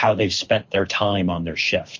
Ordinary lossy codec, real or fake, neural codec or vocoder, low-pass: MP3, 48 kbps; fake; codec, 24 kHz, 6 kbps, HILCodec; 7.2 kHz